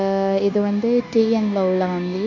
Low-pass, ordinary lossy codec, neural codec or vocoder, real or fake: 7.2 kHz; none; codec, 16 kHz, 0.9 kbps, LongCat-Audio-Codec; fake